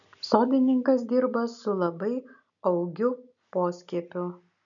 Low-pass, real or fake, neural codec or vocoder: 7.2 kHz; real; none